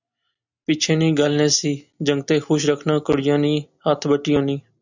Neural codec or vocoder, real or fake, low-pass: none; real; 7.2 kHz